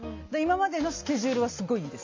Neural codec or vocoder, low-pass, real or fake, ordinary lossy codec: none; 7.2 kHz; real; MP3, 48 kbps